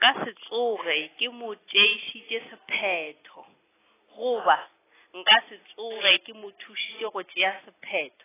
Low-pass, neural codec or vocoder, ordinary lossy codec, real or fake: 3.6 kHz; none; AAC, 16 kbps; real